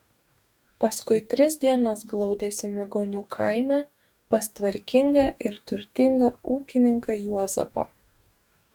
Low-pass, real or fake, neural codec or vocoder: 19.8 kHz; fake; codec, 44.1 kHz, 2.6 kbps, DAC